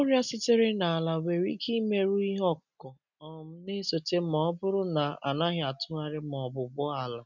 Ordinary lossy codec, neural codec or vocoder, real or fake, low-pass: none; none; real; 7.2 kHz